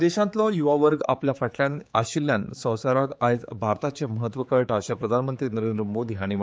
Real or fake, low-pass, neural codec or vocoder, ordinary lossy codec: fake; none; codec, 16 kHz, 4 kbps, X-Codec, HuBERT features, trained on general audio; none